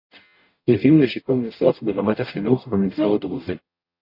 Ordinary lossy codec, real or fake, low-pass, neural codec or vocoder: AAC, 32 kbps; fake; 5.4 kHz; codec, 44.1 kHz, 0.9 kbps, DAC